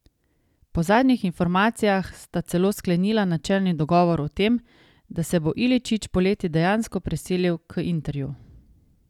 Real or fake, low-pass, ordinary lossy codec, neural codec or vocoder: real; 19.8 kHz; none; none